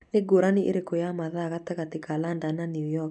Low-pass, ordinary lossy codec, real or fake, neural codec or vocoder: none; none; real; none